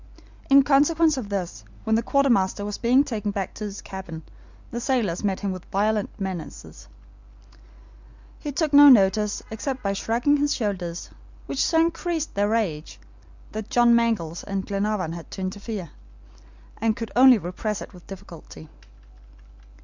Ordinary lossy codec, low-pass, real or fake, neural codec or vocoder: Opus, 64 kbps; 7.2 kHz; real; none